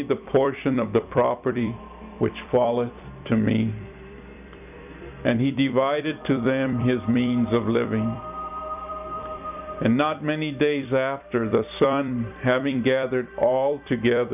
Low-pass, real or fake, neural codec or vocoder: 3.6 kHz; fake; vocoder, 44.1 kHz, 128 mel bands every 256 samples, BigVGAN v2